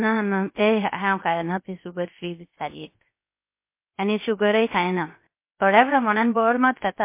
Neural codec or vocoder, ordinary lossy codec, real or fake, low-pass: codec, 16 kHz, 0.3 kbps, FocalCodec; MP3, 24 kbps; fake; 3.6 kHz